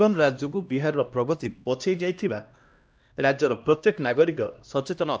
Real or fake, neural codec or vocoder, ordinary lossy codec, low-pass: fake; codec, 16 kHz, 1 kbps, X-Codec, HuBERT features, trained on LibriSpeech; none; none